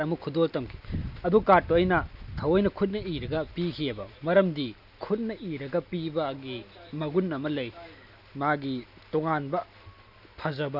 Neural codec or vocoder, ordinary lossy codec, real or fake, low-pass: none; none; real; 5.4 kHz